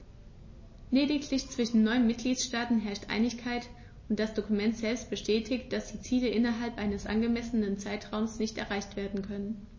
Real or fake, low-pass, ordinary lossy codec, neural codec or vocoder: real; 7.2 kHz; MP3, 32 kbps; none